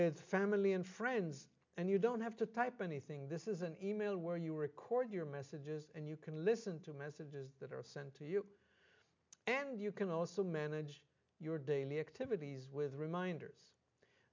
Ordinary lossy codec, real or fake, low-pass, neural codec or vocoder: MP3, 64 kbps; real; 7.2 kHz; none